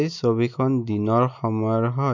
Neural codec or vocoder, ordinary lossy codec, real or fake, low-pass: none; MP3, 48 kbps; real; 7.2 kHz